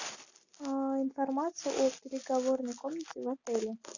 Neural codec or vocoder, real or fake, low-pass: none; real; 7.2 kHz